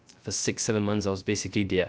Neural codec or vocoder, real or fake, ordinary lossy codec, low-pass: codec, 16 kHz, 0.3 kbps, FocalCodec; fake; none; none